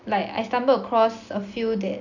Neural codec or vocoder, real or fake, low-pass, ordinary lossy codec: none; real; 7.2 kHz; none